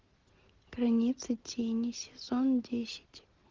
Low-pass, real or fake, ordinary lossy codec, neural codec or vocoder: 7.2 kHz; real; Opus, 16 kbps; none